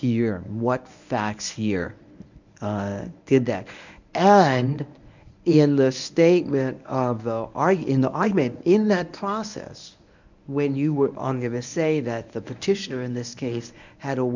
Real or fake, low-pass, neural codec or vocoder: fake; 7.2 kHz; codec, 24 kHz, 0.9 kbps, WavTokenizer, medium speech release version 1